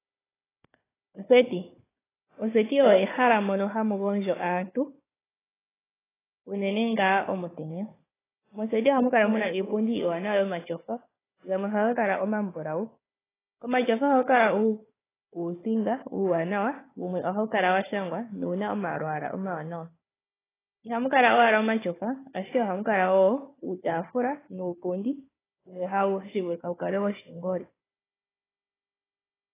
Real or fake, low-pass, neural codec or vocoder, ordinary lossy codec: fake; 3.6 kHz; codec, 16 kHz, 4 kbps, FunCodec, trained on Chinese and English, 50 frames a second; AAC, 16 kbps